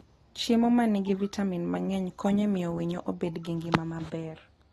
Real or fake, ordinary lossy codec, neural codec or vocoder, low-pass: real; AAC, 32 kbps; none; 19.8 kHz